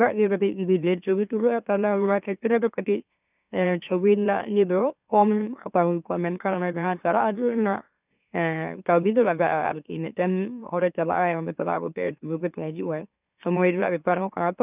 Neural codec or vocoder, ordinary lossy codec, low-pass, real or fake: autoencoder, 44.1 kHz, a latent of 192 numbers a frame, MeloTTS; none; 3.6 kHz; fake